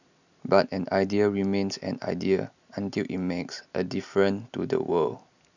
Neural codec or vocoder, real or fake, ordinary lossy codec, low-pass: none; real; none; 7.2 kHz